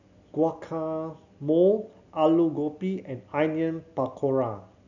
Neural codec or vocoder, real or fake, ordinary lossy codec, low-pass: none; real; none; 7.2 kHz